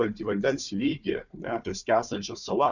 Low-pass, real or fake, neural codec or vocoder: 7.2 kHz; fake; codec, 16 kHz, 4 kbps, FunCodec, trained on Chinese and English, 50 frames a second